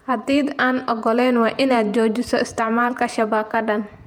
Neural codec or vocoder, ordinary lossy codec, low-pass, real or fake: vocoder, 48 kHz, 128 mel bands, Vocos; MP3, 96 kbps; 19.8 kHz; fake